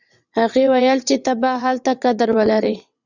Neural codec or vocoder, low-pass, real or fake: vocoder, 22.05 kHz, 80 mel bands, WaveNeXt; 7.2 kHz; fake